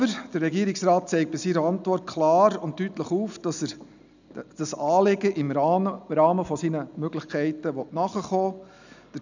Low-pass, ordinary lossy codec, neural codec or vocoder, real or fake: 7.2 kHz; none; none; real